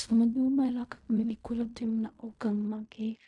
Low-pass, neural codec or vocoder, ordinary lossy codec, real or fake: 10.8 kHz; codec, 16 kHz in and 24 kHz out, 0.4 kbps, LongCat-Audio-Codec, fine tuned four codebook decoder; MP3, 64 kbps; fake